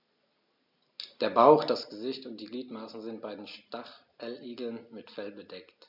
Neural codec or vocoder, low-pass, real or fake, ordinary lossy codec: none; 5.4 kHz; real; none